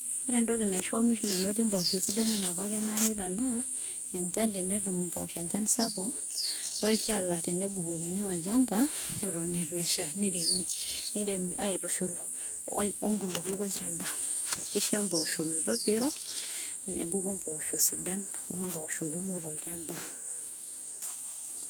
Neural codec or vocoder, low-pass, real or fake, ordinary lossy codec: codec, 44.1 kHz, 2.6 kbps, DAC; none; fake; none